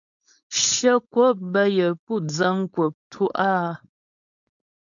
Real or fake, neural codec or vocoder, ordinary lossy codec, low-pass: fake; codec, 16 kHz, 4.8 kbps, FACodec; MP3, 96 kbps; 7.2 kHz